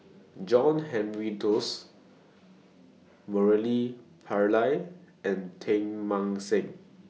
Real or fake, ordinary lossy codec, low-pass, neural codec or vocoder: real; none; none; none